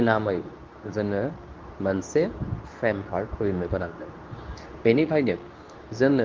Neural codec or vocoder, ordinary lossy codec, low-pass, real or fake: codec, 24 kHz, 0.9 kbps, WavTokenizer, medium speech release version 2; Opus, 32 kbps; 7.2 kHz; fake